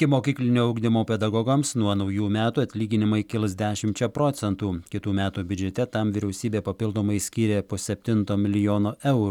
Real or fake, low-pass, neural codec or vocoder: real; 19.8 kHz; none